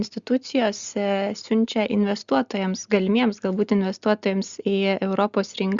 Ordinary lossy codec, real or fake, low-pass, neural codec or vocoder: Opus, 64 kbps; real; 7.2 kHz; none